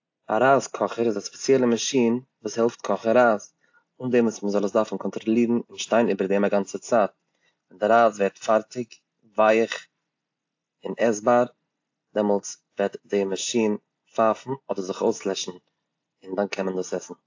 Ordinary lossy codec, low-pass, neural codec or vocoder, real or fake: AAC, 48 kbps; 7.2 kHz; none; real